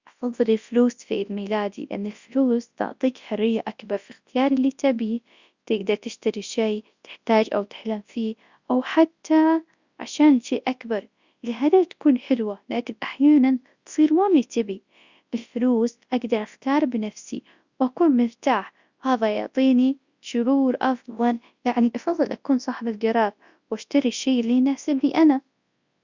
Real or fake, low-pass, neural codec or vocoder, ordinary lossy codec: fake; 7.2 kHz; codec, 24 kHz, 0.9 kbps, WavTokenizer, large speech release; Opus, 64 kbps